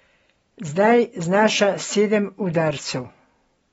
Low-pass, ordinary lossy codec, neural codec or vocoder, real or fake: 19.8 kHz; AAC, 24 kbps; none; real